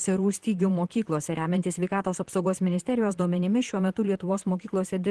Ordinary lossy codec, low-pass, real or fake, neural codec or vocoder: Opus, 16 kbps; 10.8 kHz; fake; vocoder, 44.1 kHz, 128 mel bands, Pupu-Vocoder